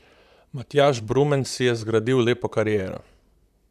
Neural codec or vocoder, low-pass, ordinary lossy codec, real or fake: vocoder, 44.1 kHz, 128 mel bands, Pupu-Vocoder; 14.4 kHz; none; fake